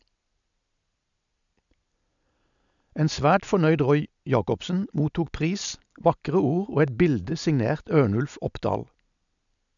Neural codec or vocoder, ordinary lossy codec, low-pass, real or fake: none; MP3, 96 kbps; 7.2 kHz; real